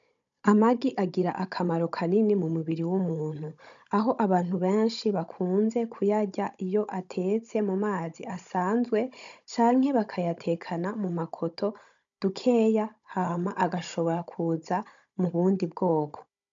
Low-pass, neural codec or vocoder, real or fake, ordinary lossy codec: 7.2 kHz; codec, 16 kHz, 16 kbps, FunCodec, trained on Chinese and English, 50 frames a second; fake; MP3, 64 kbps